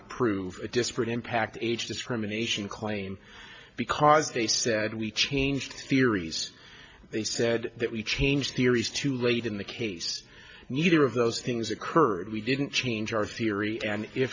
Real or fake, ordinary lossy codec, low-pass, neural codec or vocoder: real; MP3, 48 kbps; 7.2 kHz; none